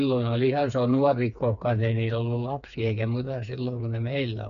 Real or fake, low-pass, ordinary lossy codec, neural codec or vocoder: fake; 7.2 kHz; none; codec, 16 kHz, 4 kbps, FreqCodec, smaller model